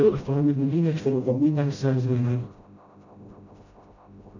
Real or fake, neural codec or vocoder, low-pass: fake; codec, 16 kHz, 0.5 kbps, FreqCodec, smaller model; 7.2 kHz